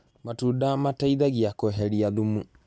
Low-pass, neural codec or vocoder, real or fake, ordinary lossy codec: none; none; real; none